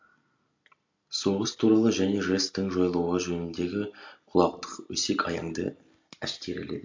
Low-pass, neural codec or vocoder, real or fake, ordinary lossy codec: 7.2 kHz; none; real; MP3, 48 kbps